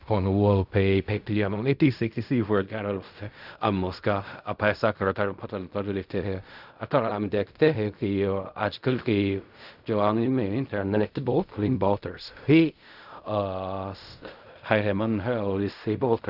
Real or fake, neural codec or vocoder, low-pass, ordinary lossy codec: fake; codec, 16 kHz in and 24 kHz out, 0.4 kbps, LongCat-Audio-Codec, fine tuned four codebook decoder; 5.4 kHz; none